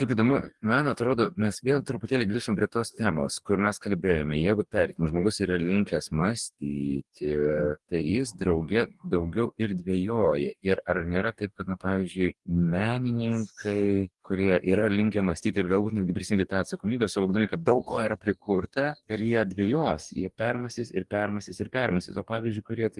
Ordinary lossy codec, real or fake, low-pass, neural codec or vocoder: Opus, 24 kbps; fake; 10.8 kHz; codec, 44.1 kHz, 2.6 kbps, DAC